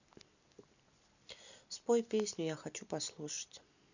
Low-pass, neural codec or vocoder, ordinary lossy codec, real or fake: 7.2 kHz; none; none; real